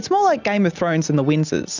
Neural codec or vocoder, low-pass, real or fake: none; 7.2 kHz; real